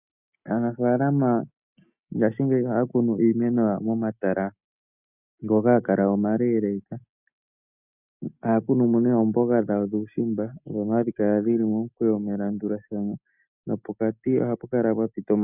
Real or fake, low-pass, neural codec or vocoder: real; 3.6 kHz; none